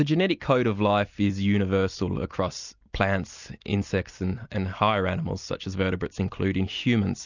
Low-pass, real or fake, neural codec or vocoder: 7.2 kHz; real; none